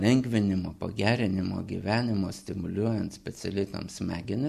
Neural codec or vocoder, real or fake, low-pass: none; real; 14.4 kHz